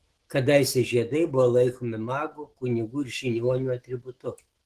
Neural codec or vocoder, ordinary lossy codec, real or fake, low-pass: autoencoder, 48 kHz, 128 numbers a frame, DAC-VAE, trained on Japanese speech; Opus, 16 kbps; fake; 14.4 kHz